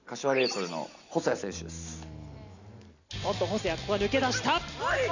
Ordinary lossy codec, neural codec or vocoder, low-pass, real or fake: none; none; 7.2 kHz; real